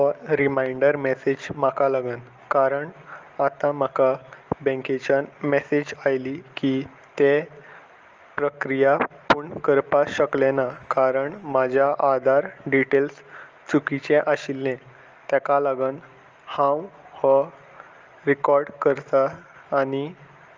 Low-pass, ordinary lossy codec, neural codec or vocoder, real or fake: 7.2 kHz; Opus, 24 kbps; none; real